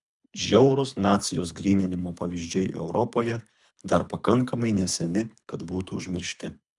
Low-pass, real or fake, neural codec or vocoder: 10.8 kHz; fake; codec, 24 kHz, 3 kbps, HILCodec